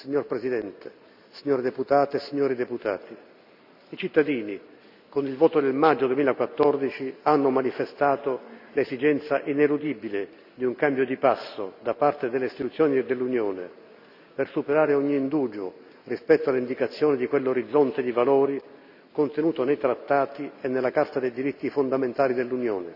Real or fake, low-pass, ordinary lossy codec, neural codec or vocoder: real; 5.4 kHz; none; none